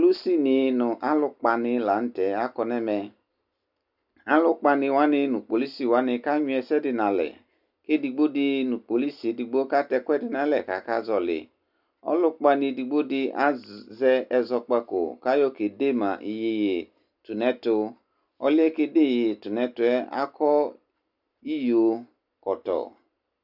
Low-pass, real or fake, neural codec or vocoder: 5.4 kHz; real; none